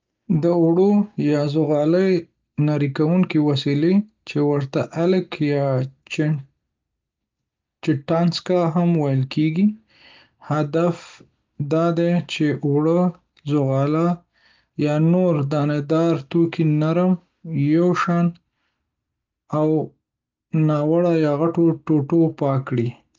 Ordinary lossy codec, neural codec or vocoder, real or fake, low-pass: Opus, 24 kbps; none; real; 7.2 kHz